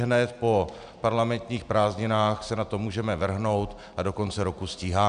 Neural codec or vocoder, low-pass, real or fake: none; 9.9 kHz; real